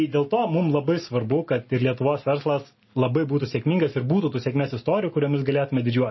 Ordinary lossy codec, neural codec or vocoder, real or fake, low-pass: MP3, 24 kbps; none; real; 7.2 kHz